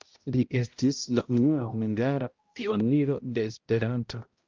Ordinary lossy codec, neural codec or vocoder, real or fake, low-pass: Opus, 32 kbps; codec, 16 kHz, 0.5 kbps, X-Codec, HuBERT features, trained on balanced general audio; fake; 7.2 kHz